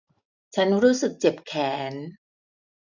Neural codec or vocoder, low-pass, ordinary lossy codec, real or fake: none; 7.2 kHz; none; real